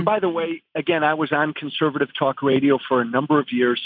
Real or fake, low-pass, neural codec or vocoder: real; 5.4 kHz; none